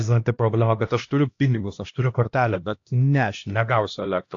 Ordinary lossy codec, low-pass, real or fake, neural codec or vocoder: AAC, 48 kbps; 7.2 kHz; fake; codec, 16 kHz, 1 kbps, X-Codec, HuBERT features, trained on LibriSpeech